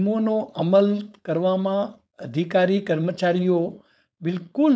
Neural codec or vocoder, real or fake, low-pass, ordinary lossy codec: codec, 16 kHz, 4.8 kbps, FACodec; fake; none; none